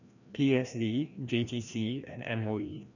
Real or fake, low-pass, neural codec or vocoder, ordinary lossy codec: fake; 7.2 kHz; codec, 16 kHz, 1 kbps, FreqCodec, larger model; none